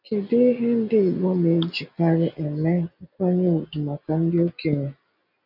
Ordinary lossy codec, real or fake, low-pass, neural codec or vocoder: none; real; 5.4 kHz; none